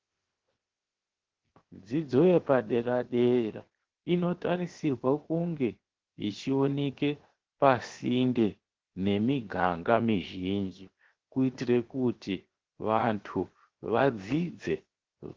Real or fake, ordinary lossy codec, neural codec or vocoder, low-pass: fake; Opus, 16 kbps; codec, 16 kHz, 0.7 kbps, FocalCodec; 7.2 kHz